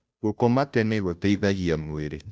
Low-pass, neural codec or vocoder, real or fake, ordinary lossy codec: none; codec, 16 kHz, 0.5 kbps, FunCodec, trained on Chinese and English, 25 frames a second; fake; none